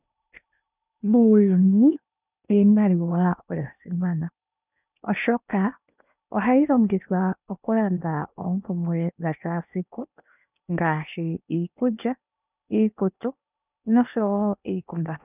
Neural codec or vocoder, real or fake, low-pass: codec, 16 kHz in and 24 kHz out, 0.8 kbps, FocalCodec, streaming, 65536 codes; fake; 3.6 kHz